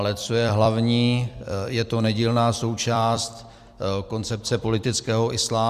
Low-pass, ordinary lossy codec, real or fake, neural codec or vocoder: 14.4 kHz; Opus, 64 kbps; fake; vocoder, 44.1 kHz, 128 mel bands every 256 samples, BigVGAN v2